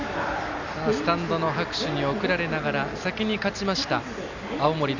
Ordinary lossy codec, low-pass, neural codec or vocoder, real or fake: none; 7.2 kHz; none; real